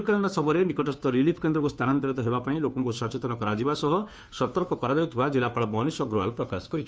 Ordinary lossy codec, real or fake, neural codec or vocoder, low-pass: none; fake; codec, 16 kHz, 2 kbps, FunCodec, trained on Chinese and English, 25 frames a second; none